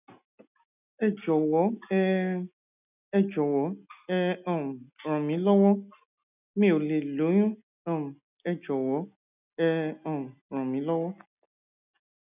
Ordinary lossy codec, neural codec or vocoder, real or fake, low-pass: none; none; real; 3.6 kHz